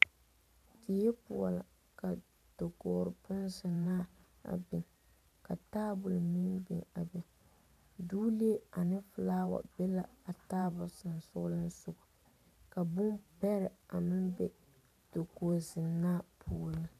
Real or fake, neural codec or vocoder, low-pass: fake; vocoder, 44.1 kHz, 128 mel bands every 512 samples, BigVGAN v2; 14.4 kHz